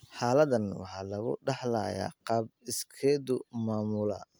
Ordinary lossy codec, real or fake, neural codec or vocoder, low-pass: none; real; none; none